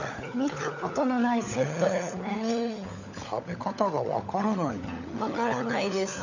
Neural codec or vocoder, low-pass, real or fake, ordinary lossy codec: codec, 16 kHz, 16 kbps, FunCodec, trained on LibriTTS, 50 frames a second; 7.2 kHz; fake; none